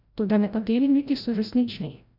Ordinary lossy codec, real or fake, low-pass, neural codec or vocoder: none; fake; 5.4 kHz; codec, 16 kHz, 0.5 kbps, FreqCodec, larger model